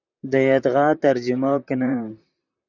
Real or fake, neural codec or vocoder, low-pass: fake; vocoder, 44.1 kHz, 128 mel bands, Pupu-Vocoder; 7.2 kHz